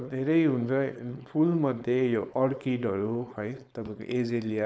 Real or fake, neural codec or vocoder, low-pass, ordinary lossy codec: fake; codec, 16 kHz, 4.8 kbps, FACodec; none; none